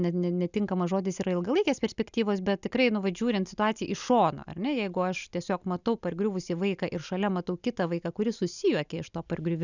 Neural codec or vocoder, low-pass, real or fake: none; 7.2 kHz; real